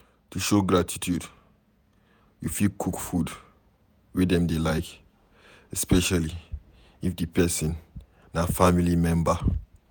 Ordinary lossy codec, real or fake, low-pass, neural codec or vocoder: none; fake; none; vocoder, 48 kHz, 128 mel bands, Vocos